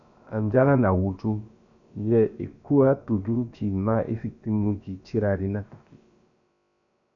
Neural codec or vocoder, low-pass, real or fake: codec, 16 kHz, about 1 kbps, DyCAST, with the encoder's durations; 7.2 kHz; fake